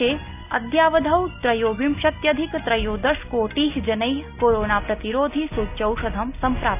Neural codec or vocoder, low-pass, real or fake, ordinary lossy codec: none; 3.6 kHz; real; none